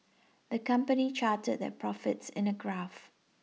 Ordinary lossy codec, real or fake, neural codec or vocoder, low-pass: none; real; none; none